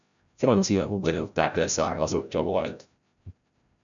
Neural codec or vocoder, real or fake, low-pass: codec, 16 kHz, 0.5 kbps, FreqCodec, larger model; fake; 7.2 kHz